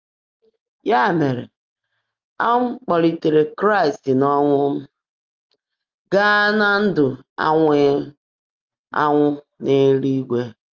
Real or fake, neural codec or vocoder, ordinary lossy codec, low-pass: real; none; Opus, 24 kbps; 7.2 kHz